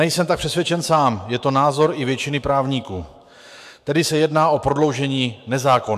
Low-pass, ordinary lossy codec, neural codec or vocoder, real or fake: 14.4 kHz; MP3, 96 kbps; autoencoder, 48 kHz, 128 numbers a frame, DAC-VAE, trained on Japanese speech; fake